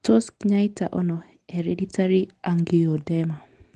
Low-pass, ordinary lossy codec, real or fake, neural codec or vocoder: 10.8 kHz; Opus, 24 kbps; real; none